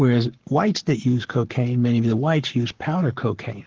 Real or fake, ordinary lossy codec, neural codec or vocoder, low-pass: fake; Opus, 16 kbps; codec, 44.1 kHz, 7.8 kbps, Pupu-Codec; 7.2 kHz